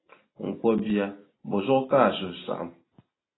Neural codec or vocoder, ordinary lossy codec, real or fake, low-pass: none; AAC, 16 kbps; real; 7.2 kHz